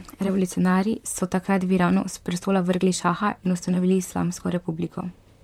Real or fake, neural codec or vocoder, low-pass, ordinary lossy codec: fake; vocoder, 44.1 kHz, 128 mel bands, Pupu-Vocoder; 19.8 kHz; MP3, 96 kbps